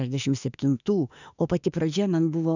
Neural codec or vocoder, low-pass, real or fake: autoencoder, 48 kHz, 32 numbers a frame, DAC-VAE, trained on Japanese speech; 7.2 kHz; fake